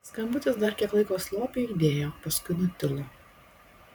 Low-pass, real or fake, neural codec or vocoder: 19.8 kHz; fake; vocoder, 44.1 kHz, 128 mel bands every 512 samples, BigVGAN v2